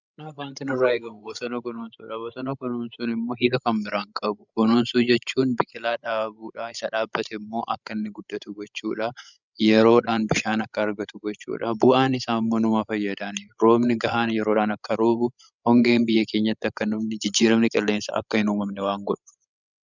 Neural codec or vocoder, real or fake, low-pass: codec, 16 kHz, 16 kbps, FreqCodec, larger model; fake; 7.2 kHz